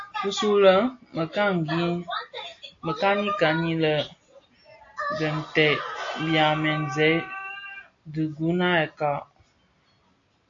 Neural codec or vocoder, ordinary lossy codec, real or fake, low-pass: none; AAC, 48 kbps; real; 7.2 kHz